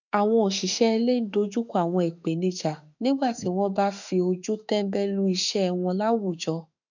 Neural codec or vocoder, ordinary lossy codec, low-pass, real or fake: autoencoder, 48 kHz, 32 numbers a frame, DAC-VAE, trained on Japanese speech; none; 7.2 kHz; fake